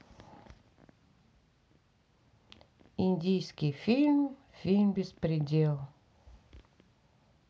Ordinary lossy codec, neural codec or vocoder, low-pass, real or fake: none; none; none; real